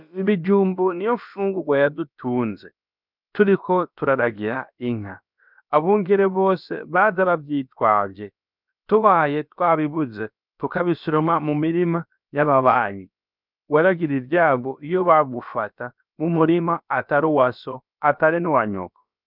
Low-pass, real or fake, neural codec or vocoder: 5.4 kHz; fake; codec, 16 kHz, about 1 kbps, DyCAST, with the encoder's durations